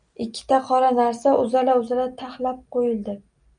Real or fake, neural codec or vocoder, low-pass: real; none; 9.9 kHz